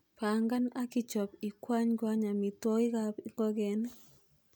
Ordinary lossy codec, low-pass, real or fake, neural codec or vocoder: none; none; real; none